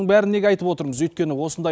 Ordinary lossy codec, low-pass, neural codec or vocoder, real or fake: none; none; none; real